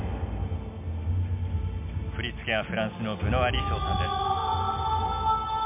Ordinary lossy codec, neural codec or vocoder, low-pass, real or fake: MP3, 32 kbps; none; 3.6 kHz; real